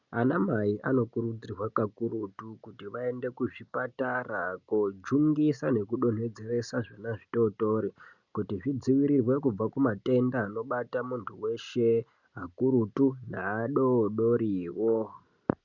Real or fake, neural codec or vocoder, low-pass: real; none; 7.2 kHz